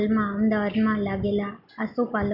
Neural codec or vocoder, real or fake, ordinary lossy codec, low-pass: none; real; none; 5.4 kHz